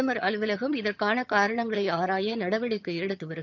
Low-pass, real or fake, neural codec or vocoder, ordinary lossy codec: 7.2 kHz; fake; vocoder, 22.05 kHz, 80 mel bands, HiFi-GAN; none